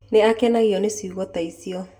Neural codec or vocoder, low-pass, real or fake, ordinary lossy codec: none; 19.8 kHz; real; none